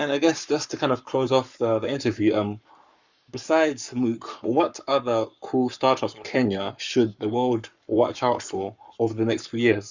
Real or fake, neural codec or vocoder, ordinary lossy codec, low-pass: fake; codec, 44.1 kHz, 7.8 kbps, Pupu-Codec; Opus, 64 kbps; 7.2 kHz